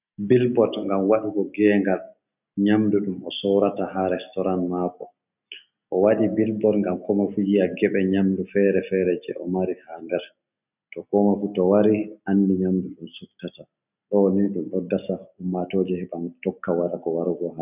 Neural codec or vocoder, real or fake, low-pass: none; real; 3.6 kHz